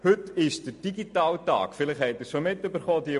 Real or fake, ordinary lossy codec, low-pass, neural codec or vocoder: real; MP3, 48 kbps; 14.4 kHz; none